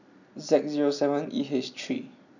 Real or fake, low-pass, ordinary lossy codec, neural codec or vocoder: real; 7.2 kHz; none; none